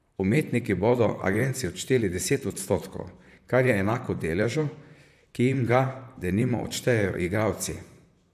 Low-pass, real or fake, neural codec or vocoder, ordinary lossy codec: 14.4 kHz; fake; vocoder, 44.1 kHz, 128 mel bands, Pupu-Vocoder; none